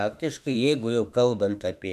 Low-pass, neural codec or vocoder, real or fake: 14.4 kHz; autoencoder, 48 kHz, 32 numbers a frame, DAC-VAE, trained on Japanese speech; fake